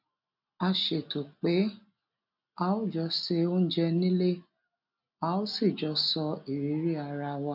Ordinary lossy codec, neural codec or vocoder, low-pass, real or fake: none; none; 5.4 kHz; real